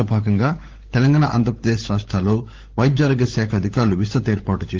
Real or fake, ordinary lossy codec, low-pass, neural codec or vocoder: fake; Opus, 16 kbps; 7.2 kHz; codec, 16 kHz, 16 kbps, FunCodec, trained on LibriTTS, 50 frames a second